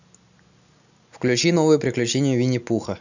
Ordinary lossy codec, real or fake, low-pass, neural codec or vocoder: none; real; 7.2 kHz; none